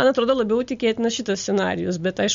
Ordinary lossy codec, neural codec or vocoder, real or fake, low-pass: MP3, 48 kbps; none; real; 7.2 kHz